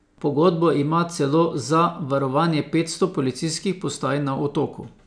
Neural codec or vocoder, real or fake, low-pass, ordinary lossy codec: none; real; 9.9 kHz; none